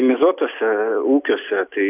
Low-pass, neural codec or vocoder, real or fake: 3.6 kHz; none; real